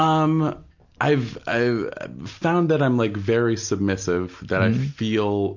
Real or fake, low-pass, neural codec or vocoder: real; 7.2 kHz; none